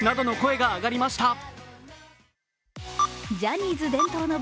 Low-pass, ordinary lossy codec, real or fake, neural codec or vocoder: none; none; real; none